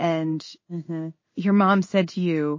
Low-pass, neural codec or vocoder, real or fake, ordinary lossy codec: 7.2 kHz; codec, 16 kHz in and 24 kHz out, 1 kbps, XY-Tokenizer; fake; MP3, 32 kbps